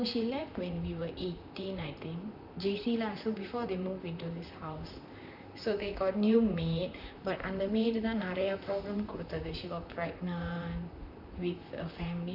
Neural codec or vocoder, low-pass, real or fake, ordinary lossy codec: vocoder, 44.1 kHz, 128 mel bands, Pupu-Vocoder; 5.4 kHz; fake; none